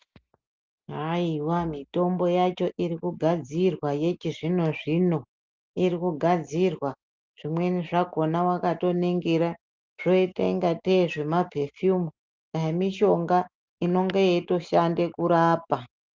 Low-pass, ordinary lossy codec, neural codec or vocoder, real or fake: 7.2 kHz; Opus, 24 kbps; none; real